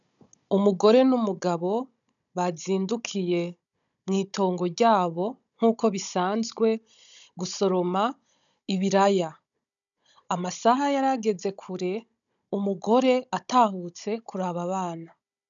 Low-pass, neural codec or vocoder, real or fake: 7.2 kHz; codec, 16 kHz, 16 kbps, FunCodec, trained on Chinese and English, 50 frames a second; fake